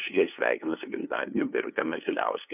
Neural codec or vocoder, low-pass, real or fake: codec, 16 kHz, 2 kbps, FunCodec, trained on LibriTTS, 25 frames a second; 3.6 kHz; fake